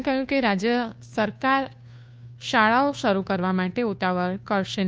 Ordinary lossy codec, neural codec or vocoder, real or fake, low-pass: none; codec, 16 kHz, 2 kbps, FunCodec, trained on Chinese and English, 25 frames a second; fake; none